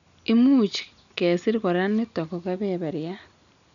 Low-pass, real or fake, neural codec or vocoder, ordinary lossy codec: 7.2 kHz; real; none; none